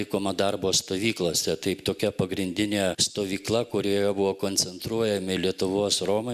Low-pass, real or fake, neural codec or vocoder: 14.4 kHz; real; none